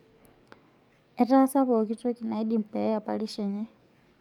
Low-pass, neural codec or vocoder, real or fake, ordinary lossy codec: 19.8 kHz; codec, 44.1 kHz, 7.8 kbps, DAC; fake; none